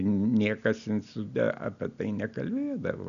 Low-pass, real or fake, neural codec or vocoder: 7.2 kHz; real; none